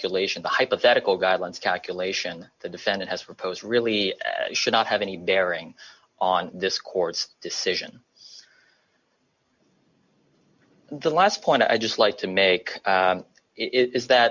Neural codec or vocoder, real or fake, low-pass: none; real; 7.2 kHz